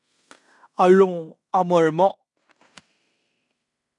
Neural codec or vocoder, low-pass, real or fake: codec, 16 kHz in and 24 kHz out, 0.9 kbps, LongCat-Audio-Codec, fine tuned four codebook decoder; 10.8 kHz; fake